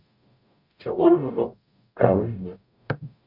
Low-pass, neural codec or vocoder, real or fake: 5.4 kHz; codec, 44.1 kHz, 0.9 kbps, DAC; fake